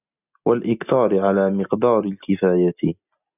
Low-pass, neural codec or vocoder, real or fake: 3.6 kHz; none; real